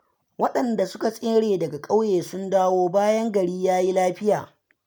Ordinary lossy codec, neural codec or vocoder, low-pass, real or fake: none; none; none; real